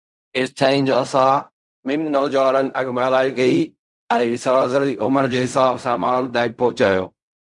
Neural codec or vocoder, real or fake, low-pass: codec, 16 kHz in and 24 kHz out, 0.4 kbps, LongCat-Audio-Codec, fine tuned four codebook decoder; fake; 10.8 kHz